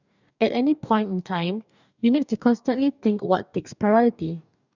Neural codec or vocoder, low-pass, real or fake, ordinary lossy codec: codec, 44.1 kHz, 2.6 kbps, DAC; 7.2 kHz; fake; none